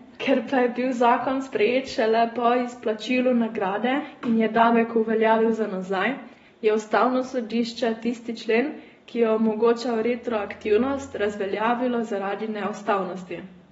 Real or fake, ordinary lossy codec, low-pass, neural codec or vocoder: real; AAC, 24 kbps; 19.8 kHz; none